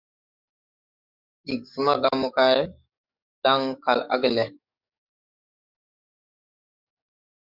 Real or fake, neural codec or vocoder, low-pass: fake; codec, 44.1 kHz, 7.8 kbps, DAC; 5.4 kHz